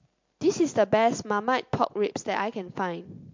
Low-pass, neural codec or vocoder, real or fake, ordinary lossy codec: 7.2 kHz; none; real; MP3, 48 kbps